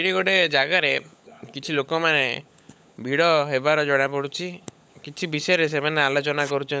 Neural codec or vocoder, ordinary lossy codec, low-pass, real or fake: codec, 16 kHz, 16 kbps, FunCodec, trained on LibriTTS, 50 frames a second; none; none; fake